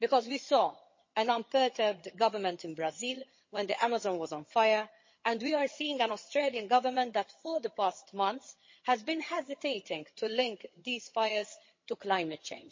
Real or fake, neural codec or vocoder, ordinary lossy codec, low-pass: fake; vocoder, 22.05 kHz, 80 mel bands, HiFi-GAN; MP3, 32 kbps; 7.2 kHz